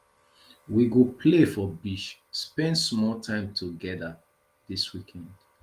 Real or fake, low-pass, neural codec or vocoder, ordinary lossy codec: real; 14.4 kHz; none; Opus, 24 kbps